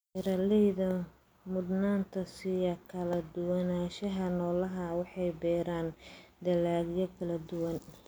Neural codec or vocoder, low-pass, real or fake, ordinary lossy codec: none; none; real; none